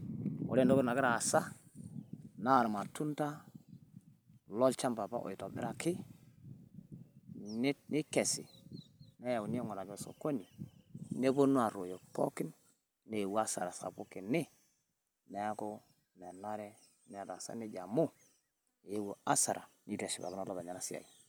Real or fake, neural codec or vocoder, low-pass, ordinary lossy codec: real; none; none; none